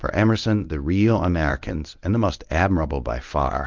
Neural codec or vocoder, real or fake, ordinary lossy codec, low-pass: codec, 24 kHz, 0.9 kbps, WavTokenizer, medium speech release version 1; fake; Opus, 24 kbps; 7.2 kHz